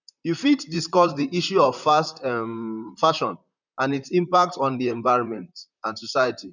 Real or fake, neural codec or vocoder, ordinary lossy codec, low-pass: fake; vocoder, 44.1 kHz, 128 mel bands, Pupu-Vocoder; none; 7.2 kHz